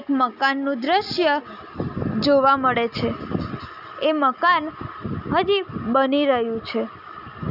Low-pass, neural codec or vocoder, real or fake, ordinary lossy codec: 5.4 kHz; none; real; none